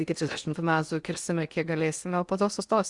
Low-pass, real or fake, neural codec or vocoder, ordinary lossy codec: 10.8 kHz; fake; codec, 16 kHz in and 24 kHz out, 0.8 kbps, FocalCodec, streaming, 65536 codes; Opus, 64 kbps